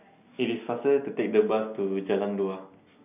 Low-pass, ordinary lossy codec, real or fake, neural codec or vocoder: 3.6 kHz; none; real; none